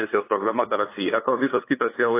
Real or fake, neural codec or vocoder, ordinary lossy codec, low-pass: fake; codec, 16 kHz, 2 kbps, FunCodec, trained on LibriTTS, 25 frames a second; AAC, 24 kbps; 3.6 kHz